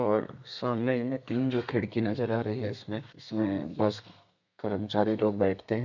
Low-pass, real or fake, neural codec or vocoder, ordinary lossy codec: 7.2 kHz; fake; codec, 32 kHz, 1.9 kbps, SNAC; none